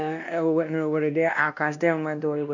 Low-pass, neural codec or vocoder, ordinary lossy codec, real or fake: 7.2 kHz; codec, 16 kHz, 1 kbps, X-Codec, WavLM features, trained on Multilingual LibriSpeech; none; fake